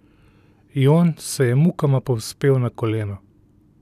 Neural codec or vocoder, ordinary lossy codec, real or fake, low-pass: none; none; real; 14.4 kHz